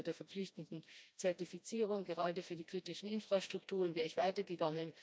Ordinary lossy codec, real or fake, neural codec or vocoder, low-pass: none; fake; codec, 16 kHz, 1 kbps, FreqCodec, smaller model; none